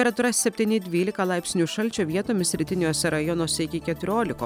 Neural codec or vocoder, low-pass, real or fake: none; 19.8 kHz; real